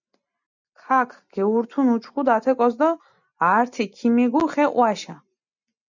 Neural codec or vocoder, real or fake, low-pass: none; real; 7.2 kHz